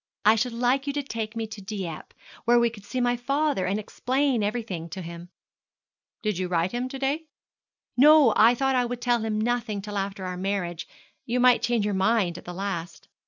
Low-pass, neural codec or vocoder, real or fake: 7.2 kHz; none; real